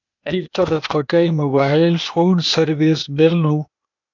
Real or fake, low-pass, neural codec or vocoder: fake; 7.2 kHz; codec, 16 kHz, 0.8 kbps, ZipCodec